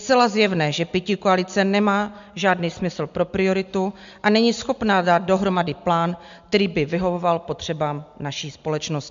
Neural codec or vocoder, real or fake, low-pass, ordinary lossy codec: none; real; 7.2 kHz; MP3, 64 kbps